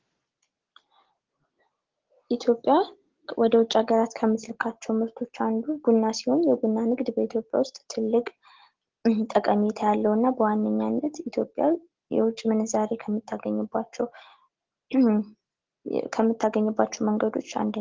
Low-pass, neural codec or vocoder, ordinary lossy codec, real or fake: 7.2 kHz; none; Opus, 16 kbps; real